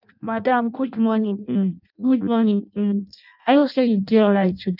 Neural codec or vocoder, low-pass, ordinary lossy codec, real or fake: codec, 16 kHz in and 24 kHz out, 0.6 kbps, FireRedTTS-2 codec; 5.4 kHz; none; fake